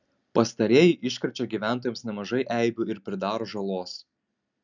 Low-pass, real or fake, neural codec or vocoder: 7.2 kHz; real; none